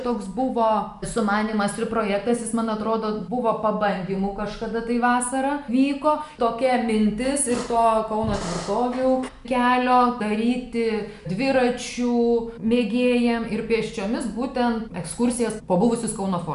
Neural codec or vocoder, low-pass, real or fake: none; 10.8 kHz; real